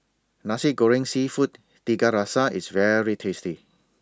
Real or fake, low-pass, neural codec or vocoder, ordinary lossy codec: real; none; none; none